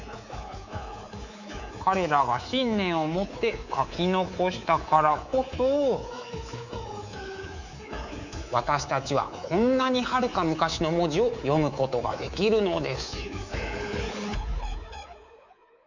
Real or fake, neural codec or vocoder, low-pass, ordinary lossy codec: fake; codec, 24 kHz, 3.1 kbps, DualCodec; 7.2 kHz; none